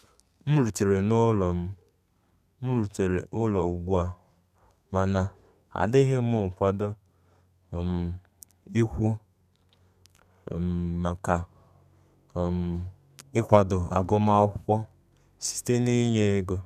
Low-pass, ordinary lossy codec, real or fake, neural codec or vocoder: 14.4 kHz; none; fake; codec, 32 kHz, 1.9 kbps, SNAC